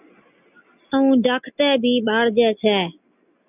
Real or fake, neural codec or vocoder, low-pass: real; none; 3.6 kHz